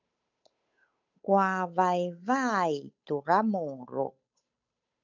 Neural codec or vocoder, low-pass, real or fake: codec, 16 kHz, 8 kbps, FunCodec, trained on Chinese and English, 25 frames a second; 7.2 kHz; fake